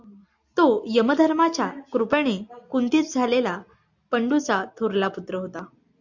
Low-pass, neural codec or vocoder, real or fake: 7.2 kHz; none; real